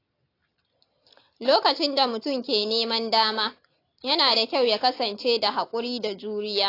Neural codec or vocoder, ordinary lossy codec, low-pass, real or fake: none; AAC, 32 kbps; 5.4 kHz; real